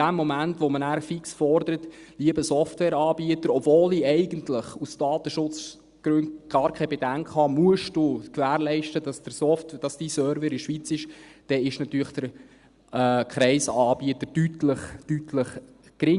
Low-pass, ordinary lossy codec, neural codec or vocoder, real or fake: 10.8 kHz; Opus, 64 kbps; none; real